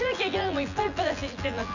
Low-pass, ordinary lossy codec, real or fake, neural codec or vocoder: 7.2 kHz; none; fake; vocoder, 24 kHz, 100 mel bands, Vocos